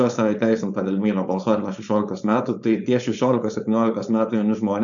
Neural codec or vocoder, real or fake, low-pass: codec, 16 kHz, 4.8 kbps, FACodec; fake; 7.2 kHz